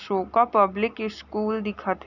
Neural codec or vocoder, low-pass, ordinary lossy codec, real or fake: none; 7.2 kHz; none; real